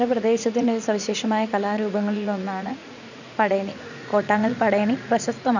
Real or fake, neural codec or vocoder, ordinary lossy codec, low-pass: fake; vocoder, 44.1 kHz, 80 mel bands, Vocos; none; 7.2 kHz